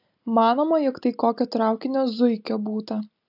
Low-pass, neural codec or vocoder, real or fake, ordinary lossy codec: 5.4 kHz; none; real; AAC, 48 kbps